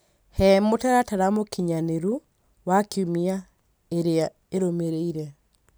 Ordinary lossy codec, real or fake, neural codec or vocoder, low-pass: none; real; none; none